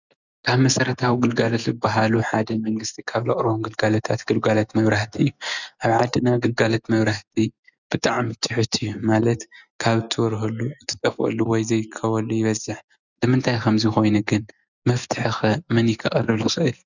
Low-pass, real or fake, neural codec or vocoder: 7.2 kHz; real; none